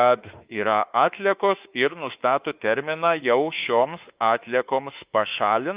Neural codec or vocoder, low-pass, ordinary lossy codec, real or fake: autoencoder, 48 kHz, 32 numbers a frame, DAC-VAE, trained on Japanese speech; 3.6 kHz; Opus, 32 kbps; fake